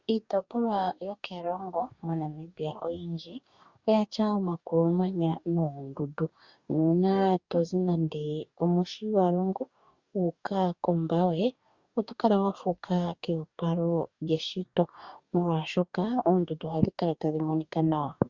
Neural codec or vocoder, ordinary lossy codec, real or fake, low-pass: codec, 44.1 kHz, 2.6 kbps, DAC; Opus, 64 kbps; fake; 7.2 kHz